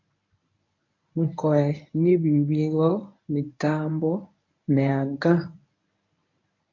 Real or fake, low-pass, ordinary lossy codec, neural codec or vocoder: fake; 7.2 kHz; MP3, 48 kbps; codec, 24 kHz, 0.9 kbps, WavTokenizer, medium speech release version 1